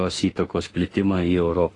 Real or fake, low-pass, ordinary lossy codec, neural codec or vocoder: fake; 10.8 kHz; AAC, 32 kbps; autoencoder, 48 kHz, 32 numbers a frame, DAC-VAE, trained on Japanese speech